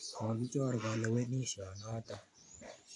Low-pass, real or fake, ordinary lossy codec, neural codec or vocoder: 10.8 kHz; fake; AAC, 48 kbps; vocoder, 24 kHz, 100 mel bands, Vocos